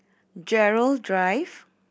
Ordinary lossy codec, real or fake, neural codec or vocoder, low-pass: none; real; none; none